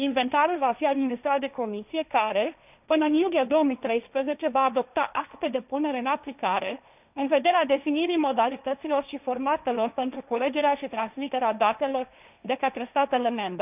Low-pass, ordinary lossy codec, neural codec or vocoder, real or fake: 3.6 kHz; none; codec, 16 kHz, 1.1 kbps, Voila-Tokenizer; fake